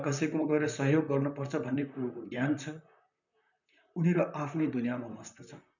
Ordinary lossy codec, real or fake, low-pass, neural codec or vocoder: none; fake; 7.2 kHz; vocoder, 44.1 kHz, 128 mel bands, Pupu-Vocoder